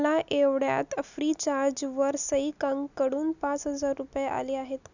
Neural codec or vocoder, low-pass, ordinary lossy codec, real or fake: none; 7.2 kHz; none; real